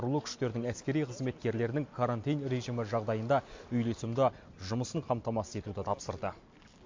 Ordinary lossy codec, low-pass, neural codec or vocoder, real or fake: MP3, 48 kbps; 7.2 kHz; none; real